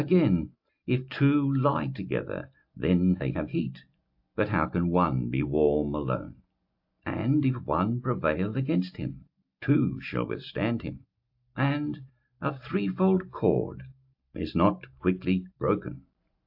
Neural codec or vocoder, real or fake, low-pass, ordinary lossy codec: none; real; 5.4 kHz; AAC, 48 kbps